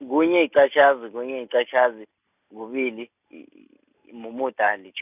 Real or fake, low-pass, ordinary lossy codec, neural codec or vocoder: real; 3.6 kHz; none; none